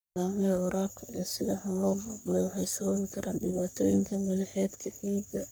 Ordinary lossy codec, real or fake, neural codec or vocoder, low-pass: none; fake; codec, 44.1 kHz, 3.4 kbps, Pupu-Codec; none